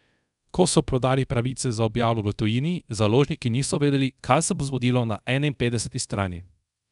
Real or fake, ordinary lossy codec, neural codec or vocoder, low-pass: fake; none; codec, 24 kHz, 0.5 kbps, DualCodec; 10.8 kHz